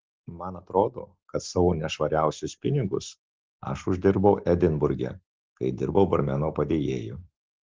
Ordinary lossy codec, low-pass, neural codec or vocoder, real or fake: Opus, 16 kbps; 7.2 kHz; none; real